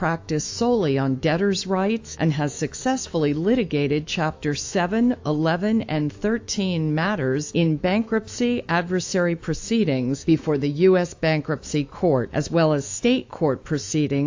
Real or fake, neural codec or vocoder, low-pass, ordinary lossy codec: fake; autoencoder, 48 kHz, 128 numbers a frame, DAC-VAE, trained on Japanese speech; 7.2 kHz; AAC, 48 kbps